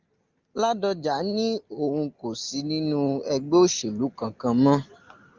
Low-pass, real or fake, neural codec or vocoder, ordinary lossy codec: 7.2 kHz; real; none; Opus, 32 kbps